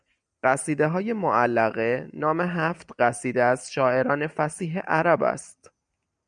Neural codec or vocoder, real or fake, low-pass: none; real; 9.9 kHz